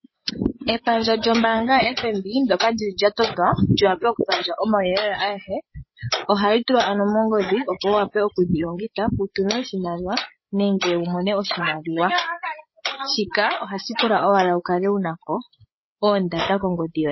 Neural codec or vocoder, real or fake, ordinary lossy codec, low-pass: codec, 16 kHz, 8 kbps, FreqCodec, larger model; fake; MP3, 24 kbps; 7.2 kHz